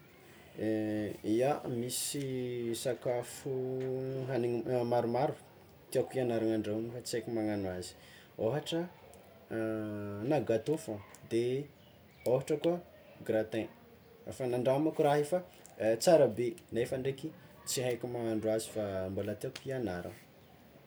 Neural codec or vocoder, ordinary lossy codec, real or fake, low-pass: none; none; real; none